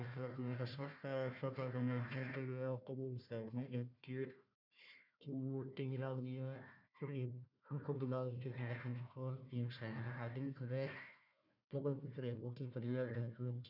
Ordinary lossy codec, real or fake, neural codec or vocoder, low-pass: none; fake; codec, 16 kHz, 1 kbps, FunCodec, trained on Chinese and English, 50 frames a second; 5.4 kHz